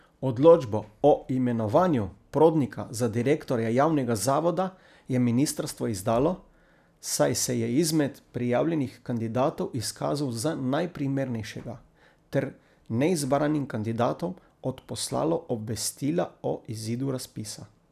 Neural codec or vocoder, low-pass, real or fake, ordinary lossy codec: none; 14.4 kHz; real; none